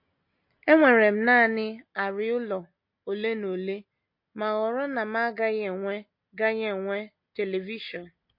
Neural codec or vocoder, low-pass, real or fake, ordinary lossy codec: none; 5.4 kHz; real; MP3, 32 kbps